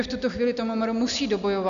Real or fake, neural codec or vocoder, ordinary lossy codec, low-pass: real; none; MP3, 96 kbps; 7.2 kHz